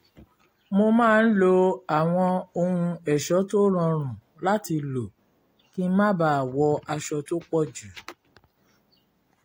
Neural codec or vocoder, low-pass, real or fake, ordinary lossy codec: none; 19.8 kHz; real; AAC, 48 kbps